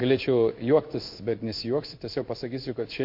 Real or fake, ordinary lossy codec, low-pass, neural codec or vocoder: fake; MP3, 32 kbps; 5.4 kHz; codec, 16 kHz in and 24 kHz out, 1 kbps, XY-Tokenizer